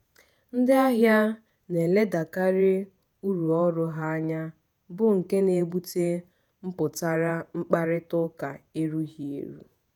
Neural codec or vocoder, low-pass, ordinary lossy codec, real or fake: vocoder, 48 kHz, 128 mel bands, Vocos; none; none; fake